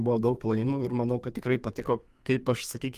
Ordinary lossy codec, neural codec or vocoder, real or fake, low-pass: Opus, 32 kbps; codec, 32 kHz, 1.9 kbps, SNAC; fake; 14.4 kHz